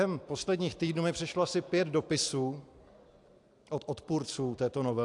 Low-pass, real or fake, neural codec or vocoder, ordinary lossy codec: 10.8 kHz; real; none; AAC, 64 kbps